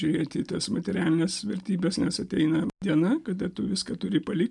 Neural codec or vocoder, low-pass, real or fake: none; 10.8 kHz; real